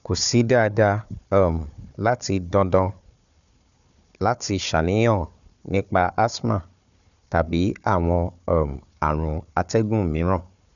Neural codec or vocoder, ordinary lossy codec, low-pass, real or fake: codec, 16 kHz, 4 kbps, FunCodec, trained on Chinese and English, 50 frames a second; none; 7.2 kHz; fake